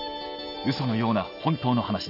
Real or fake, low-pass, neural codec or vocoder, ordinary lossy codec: real; 5.4 kHz; none; none